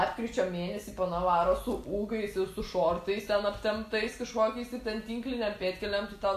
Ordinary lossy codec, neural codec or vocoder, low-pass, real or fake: AAC, 64 kbps; none; 14.4 kHz; real